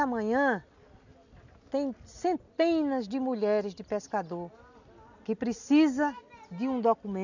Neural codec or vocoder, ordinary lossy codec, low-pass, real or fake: none; none; 7.2 kHz; real